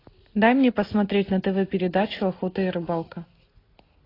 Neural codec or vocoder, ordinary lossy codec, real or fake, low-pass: none; AAC, 24 kbps; real; 5.4 kHz